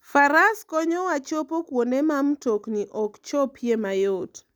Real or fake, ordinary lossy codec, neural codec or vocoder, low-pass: real; none; none; none